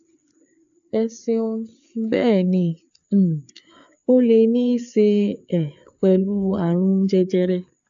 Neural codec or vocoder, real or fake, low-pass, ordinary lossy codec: codec, 16 kHz, 4 kbps, FreqCodec, larger model; fake; 7.2 kHz; AAC, 64 kbps